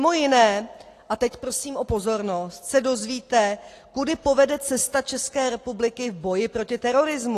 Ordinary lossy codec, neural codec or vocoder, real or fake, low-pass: AAC, 48 kbps; none; real; 14.4 kHz